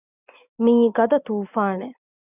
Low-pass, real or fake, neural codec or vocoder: 3.6 kHz; real; none